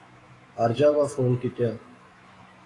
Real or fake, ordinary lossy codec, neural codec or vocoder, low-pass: fake; AAC, 32 kbps; autoencoder, 48 kHz, 128 numbers a frame, DAC-VAE, trained on Japanese speech; 10.8 kHz